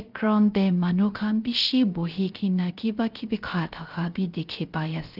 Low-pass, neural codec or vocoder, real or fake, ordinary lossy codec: 5.4 kHz; codec, 16 kHz, 0.3 kbps, FocalCodec; fake; Opus, 32 kbps